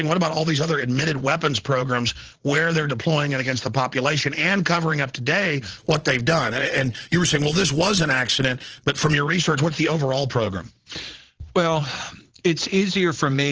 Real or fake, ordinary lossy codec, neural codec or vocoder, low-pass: fake; Opus, 16 kbps; vocoder, 22.05 kHz, 80 mel bands, Vocos; 7.2 kHz